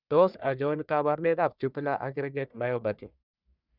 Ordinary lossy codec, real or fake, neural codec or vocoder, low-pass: none; fake; codec, 44.1 kHz, 1.7 kbps, Pupu-Codec; 5.4 kHz